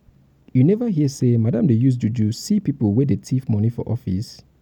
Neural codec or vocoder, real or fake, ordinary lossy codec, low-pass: none; real; none; 19.8 kHz